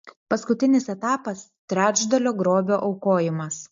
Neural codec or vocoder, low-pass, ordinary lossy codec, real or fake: none; 7.2 kHz; MP3, 48 kbps; real